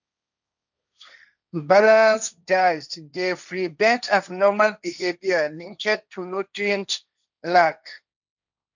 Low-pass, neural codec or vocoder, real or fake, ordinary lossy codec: 7.2 kHz; codec, 16 kHz, 1.1 kbps, Voila-Tokenizer; fake; none